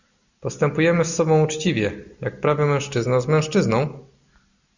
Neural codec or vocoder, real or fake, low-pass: none; real; 7.2 kHz